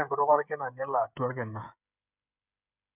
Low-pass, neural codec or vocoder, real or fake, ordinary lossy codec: 3.6 kHz; codec, 16 kHz, 8 kbps, FreqCodec, smaller model; fake; none